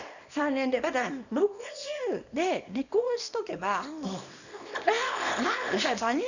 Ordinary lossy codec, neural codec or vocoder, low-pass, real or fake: none; codec, 24 kHz, 0.9 kbps, WavTokenizer, small release; 7.2 kHz; fake